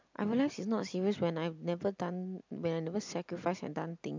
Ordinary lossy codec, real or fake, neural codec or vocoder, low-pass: MP3, 64 kbps; real; none; 7.2 kHz